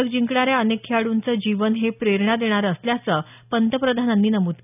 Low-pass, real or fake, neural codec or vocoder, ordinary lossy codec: 3.6 kHz; real; none; none